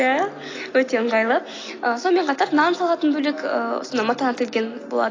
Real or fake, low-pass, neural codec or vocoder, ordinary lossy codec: real; 7.2 kHz; none; AAC, 32 kbps